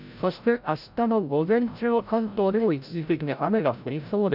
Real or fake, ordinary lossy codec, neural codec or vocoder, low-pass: fake; none; codec, 16 kHz, 0.5 kbps, FreqCodec, larger model; 5.4 kHz